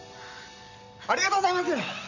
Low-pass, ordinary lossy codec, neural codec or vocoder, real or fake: 7.2 kHz; none; none; real